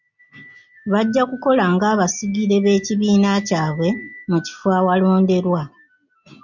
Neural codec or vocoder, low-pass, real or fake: none; 7.2 kHz; real